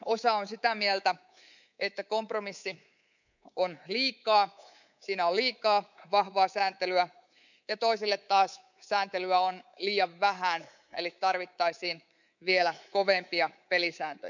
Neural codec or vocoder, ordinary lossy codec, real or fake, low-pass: codec, 24 kHz, 3.1 kbps, DualCodec; none; fake; 7.2 kHz